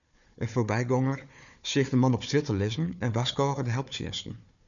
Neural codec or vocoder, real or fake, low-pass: codec, 16 kHz, 4 kbps, FunCodec, trained on Chinese and English, 50 frames a second; fake; 7.2 kHz